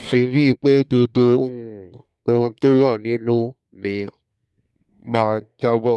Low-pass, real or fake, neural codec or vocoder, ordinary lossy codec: none; fake; codec, 24 kHz, 1 kbps, SNAC; none